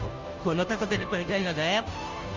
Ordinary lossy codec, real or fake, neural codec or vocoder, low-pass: Opus, 32 kbps; fake; codec, 16 kHz, 0.5 kbps, FunCodec, trained on Chinese and English, 25 frames a second; 7.2 kHz